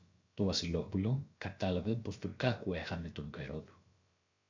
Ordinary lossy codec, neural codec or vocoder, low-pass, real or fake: MP3, 64 kbps; codec, 16 kHz, about 1 kbps, DyCAST, with the encoder's durations; 7.2 kHz; fake